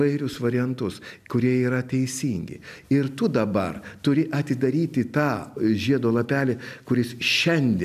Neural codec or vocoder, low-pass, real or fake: none; 14.4 kHz; real